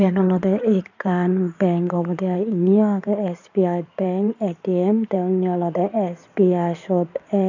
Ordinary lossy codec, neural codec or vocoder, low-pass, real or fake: none; codec, 16 kHz in and 24 kHz out, 2.2 kbps, FireRedTTS-2 codec; 7.2 kHz; fake